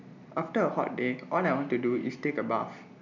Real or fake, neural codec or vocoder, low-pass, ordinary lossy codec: real; none; 7.2 kHz; none